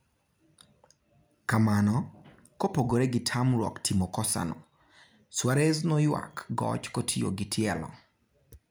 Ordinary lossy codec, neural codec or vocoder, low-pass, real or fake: none; none; none; real